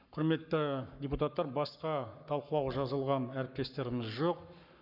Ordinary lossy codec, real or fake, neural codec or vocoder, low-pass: none; fake; codec, 44.1 kHz, 7.8 kbps, Pupu-Codec; 5.4 kHz